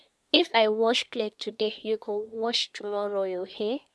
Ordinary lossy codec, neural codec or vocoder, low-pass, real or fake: none; codec, 24 kHz, 1 kbps, SNAC; none; fake